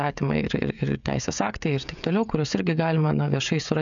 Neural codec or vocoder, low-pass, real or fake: codec, 16 kHz, 16 kbps, FreqCodec, smaller model; 7.2 kHz; fake